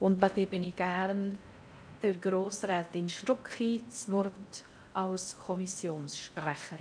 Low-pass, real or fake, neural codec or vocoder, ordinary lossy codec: 9.9 kHz; fake; codec, 16 kHz in and 24 kHz out, 0.6 kbps, FocalCodec, streaming, 4096 codes; none